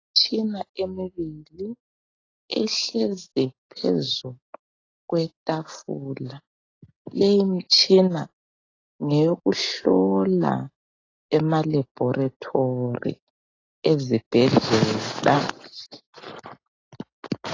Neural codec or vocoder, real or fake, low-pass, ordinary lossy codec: none; real; 7.2 kHz; AAC, 32 kbps